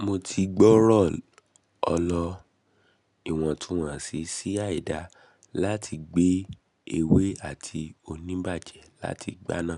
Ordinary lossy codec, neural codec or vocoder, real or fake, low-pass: none; none; real; 10.8 kHz